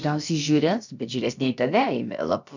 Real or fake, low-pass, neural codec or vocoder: fake; 7.2 kHz; codec, 16 kHz, about 1 kbps, DyCAST, with the encoder's durations